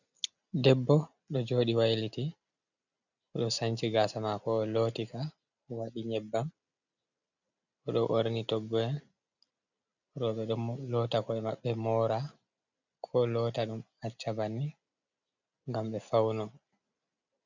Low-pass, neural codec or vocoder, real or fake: 7.2 kHz; none; real